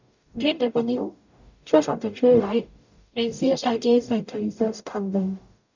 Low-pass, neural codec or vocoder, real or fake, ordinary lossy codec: 7.2 kHz; codec, 44.1 kHz, 0.9 kbps, DAC; fake; none